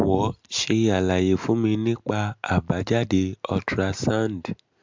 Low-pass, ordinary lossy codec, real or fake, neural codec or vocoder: 7.2 kHz; MP3, 64 kbps; real; none